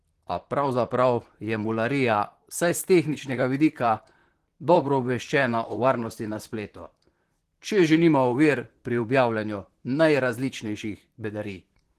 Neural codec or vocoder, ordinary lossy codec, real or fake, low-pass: vocoder, 44.1 kHz, 128 mel bands, Pupu-Vocoder; Opus, 16 kbps; fake; 14.4 kHz